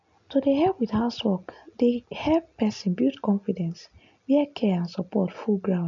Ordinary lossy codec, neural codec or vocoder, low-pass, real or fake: none; none; 7.2 kHz; real